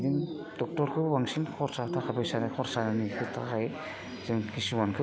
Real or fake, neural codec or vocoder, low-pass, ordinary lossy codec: real; none; none; none